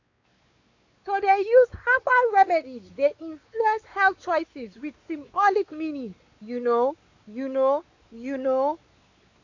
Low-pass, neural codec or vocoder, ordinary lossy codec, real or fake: 7.2 kHz; codec, 16 kHz, 4 kbps, X-Codec, WavLM features, trained on Multilingual LibriSpeech; AAC, 48 kbps; fake